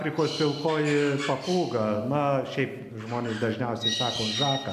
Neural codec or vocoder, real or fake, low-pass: none; real; 14.4 kHz